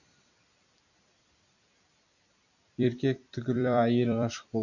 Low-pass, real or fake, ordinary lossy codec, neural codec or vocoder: 7.2 kHz; fake; none; vocoder, 44.1 kHz, 128 mel bands every 256 samples, BigVGAN v2